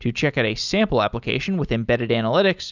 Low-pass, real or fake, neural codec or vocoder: 7.2 kHz; real; none